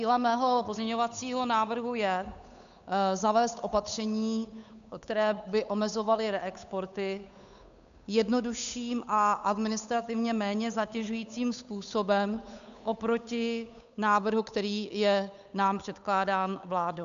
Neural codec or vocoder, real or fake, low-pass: codec, 16 kHz, 8 kbps, FunCodec, trained on Chinese and English, 25 frames a second; fake; 7.2 kHz